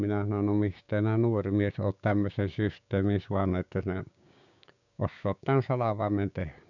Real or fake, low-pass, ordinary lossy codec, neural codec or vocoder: fake; 7.2 kHz; none; codec, 16 kHz, 6 kbps, DAC